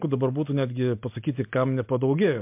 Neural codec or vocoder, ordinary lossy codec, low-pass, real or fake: none; MP3, 32 kbps; 3.6 kHz; real